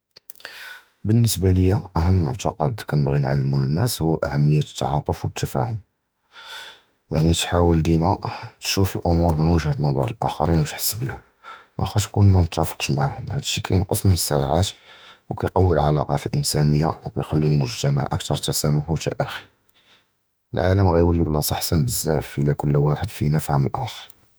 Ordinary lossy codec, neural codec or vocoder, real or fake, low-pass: none; autoencoder, 48 kHz, 32 numbers a frame, DAC-VAE, trained on Japanese speech; fake; none